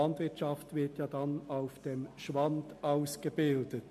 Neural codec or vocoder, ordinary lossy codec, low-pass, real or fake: none; MP3, 64 kbps; 14.4 kHz; real